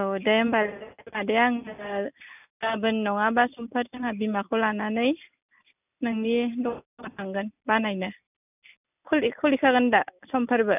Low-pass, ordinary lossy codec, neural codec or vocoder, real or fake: 3.6 kHz; none; none; real